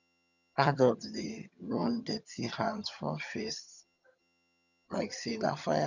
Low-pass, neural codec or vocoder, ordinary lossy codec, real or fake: 7.2 kHz; vocoder, 22.05 kHz, 80 mel bands, HiFi-GAN; none; fake